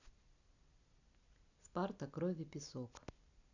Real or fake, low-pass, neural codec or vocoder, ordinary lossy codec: real; 7.2 kHz; none; none